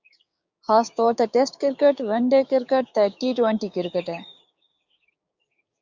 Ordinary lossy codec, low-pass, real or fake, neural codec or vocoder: Opus, 64 kbps; 7.2 kHz; fake; codec, 16 kHz, 6 kbps, DAC